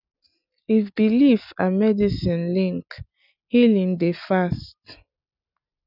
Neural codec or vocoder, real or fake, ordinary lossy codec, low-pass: none; real; none; 5.4 kHz